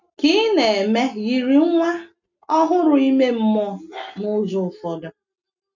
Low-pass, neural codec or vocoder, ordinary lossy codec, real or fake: 7.2 kHz; none; none; real